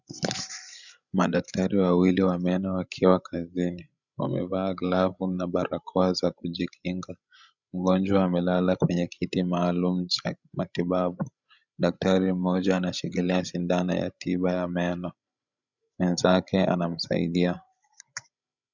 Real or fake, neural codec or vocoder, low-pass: fake; codec, 16 kHz, 16 kbps, FreqCodec, larger model; 7.2 kHz